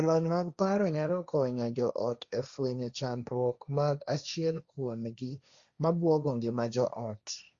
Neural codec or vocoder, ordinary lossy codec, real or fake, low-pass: codec, 16 kHz, 1.1 kbps, Voila-Tokenizer; Opus, 64 kbps; fake; 7.2 kHz